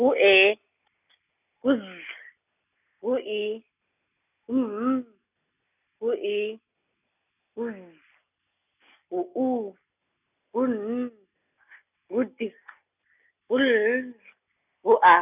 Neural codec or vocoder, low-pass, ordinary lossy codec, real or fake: none; 3.6 kHz; none; real